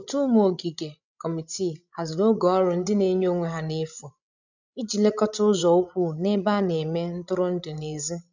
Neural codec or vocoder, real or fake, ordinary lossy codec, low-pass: codec, 16 kHz, 16 kbps, FreqCodec, larger model; fake; none; 7.2 kHz